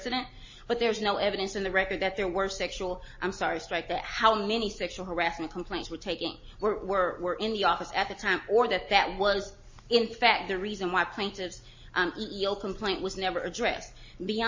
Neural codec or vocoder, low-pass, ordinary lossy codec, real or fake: none; 7.2 kHz; MP3, 32 kbps; real